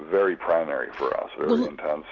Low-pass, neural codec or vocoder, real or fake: 7.2 kHz; none; real